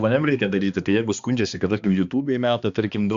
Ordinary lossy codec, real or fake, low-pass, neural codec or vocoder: Opus, 64 kbps; fake; 7.2 kHz; codec, 16 kHz, 2 kbps, X-Codec, HuBERT features, trained on balanced general audio